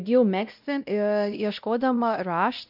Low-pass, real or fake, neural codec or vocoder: 5.4 kHz; fake; codec, 16 kHz, 0.5 kbps, X-Codec, WavLM features, trained on Multilingual LibriSpeech